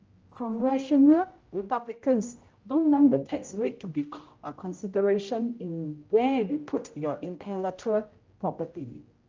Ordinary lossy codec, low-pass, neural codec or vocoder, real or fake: Opus, 24 kbps; 7.2 kHz; codec, 16 kHz, 0.5 kbps, X-Codec, HuBERT features, trained on general audio; fake